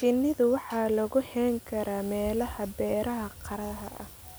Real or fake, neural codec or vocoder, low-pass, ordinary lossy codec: real; none; none; none